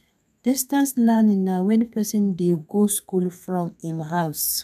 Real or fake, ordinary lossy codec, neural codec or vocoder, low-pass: fake; none; codec, 32 kHz, 1.9 kbps, SNAC; 14.4 kHz